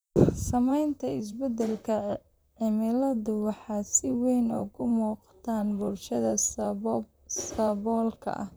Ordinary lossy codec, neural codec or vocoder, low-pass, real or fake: none; vocoder, 44.1 kHz, 128 mel bands, Pupu-Vocoder; none; fake